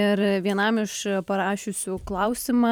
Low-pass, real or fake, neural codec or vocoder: 19.8 kHz; fake; vocoder, 44.1 kHz, 128 mel bands every 512 samples, BigVGAN v2